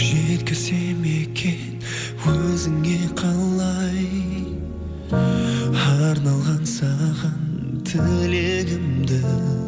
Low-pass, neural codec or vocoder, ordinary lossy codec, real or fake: none; none; none; real